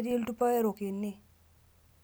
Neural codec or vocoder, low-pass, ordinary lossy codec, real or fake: none; none; none; real